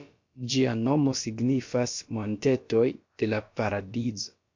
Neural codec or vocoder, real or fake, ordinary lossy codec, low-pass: codec, 16 kHz, about 1 kbps, DyCAST, with the encoder's durations; fake; MP3, 48 kbps; 7.2 kHz